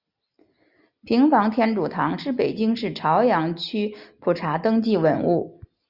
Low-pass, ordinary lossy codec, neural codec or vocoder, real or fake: 5.4 kHz; Opus, 64 kbps; none; real